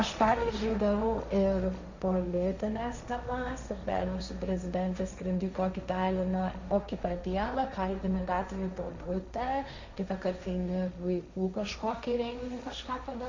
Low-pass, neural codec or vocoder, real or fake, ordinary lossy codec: 7.2 kHz; codec, 16 kHz, 1.1 kbps, Voila-Tokenizer; fake; Opus, 64 kbps